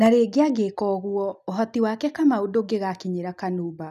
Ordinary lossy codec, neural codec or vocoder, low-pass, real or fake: none; none; 14.4 kHz; real